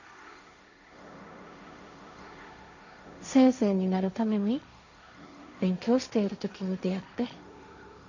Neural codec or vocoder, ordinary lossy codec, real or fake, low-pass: codec, 16 kHz, 1.1 kbps, Voila-Tokenizer; none; fake; 7.2 kHz